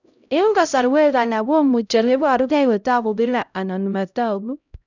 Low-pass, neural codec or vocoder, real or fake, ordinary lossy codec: 7.2 kHz; codec, 16 kHz, 0.5 kbps, X-Codec, HuBERT features, trained on LibriSpeech; fake; none